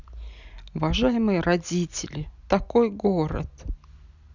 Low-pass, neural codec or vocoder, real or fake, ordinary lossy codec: 7.2 kHz; none; real; none